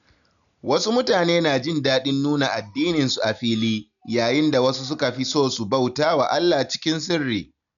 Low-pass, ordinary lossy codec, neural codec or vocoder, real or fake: 7.2 kHz; none; none; real